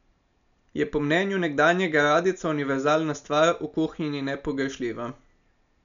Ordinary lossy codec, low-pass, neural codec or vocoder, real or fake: none; 7.2 kHz; none; real